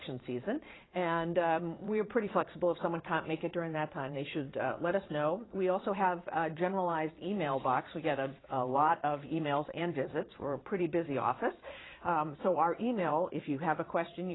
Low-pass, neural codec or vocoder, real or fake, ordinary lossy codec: 7.2 kHz; vocoder, 22.05 kHz, 80 mel bands, Vocos; fake; AAC, 16 kbps